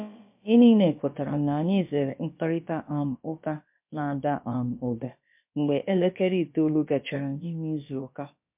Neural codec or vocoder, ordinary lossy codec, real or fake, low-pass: codec, 16 kHz, about 1 kbps, DyCAST, with the encoder's durations; MP3, 32 kbps; fake; 3.6 kHz